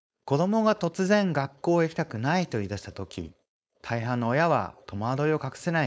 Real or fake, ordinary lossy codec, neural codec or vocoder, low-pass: fake; none; codec, 16 kHz, 4.8 kbps, FACodec; none